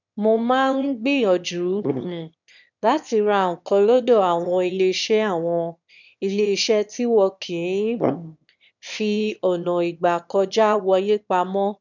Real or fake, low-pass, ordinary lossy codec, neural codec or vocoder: fake; 7.2 kHz; none; autoencoder, 22.05 kHz, a latent of 192 numbers a frame, VITS, trained on one speaker